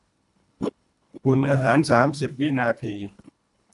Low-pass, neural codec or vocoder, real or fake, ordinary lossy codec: 10.8 kHz; codec, 24 kHz, 1.5 kbps, HILCodec; fake; none